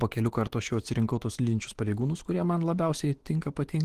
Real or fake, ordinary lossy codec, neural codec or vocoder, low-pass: fake; Opus, 16 kbps; autoencoder, 48 kHz, 128 numbers a frame, DAC-VAE, trained on Japanese speech; 14.4 kHz